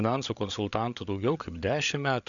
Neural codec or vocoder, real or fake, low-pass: codec, 16 kHz, 8 kbps, FreqCodec, larger model; fake; 7.2 kHz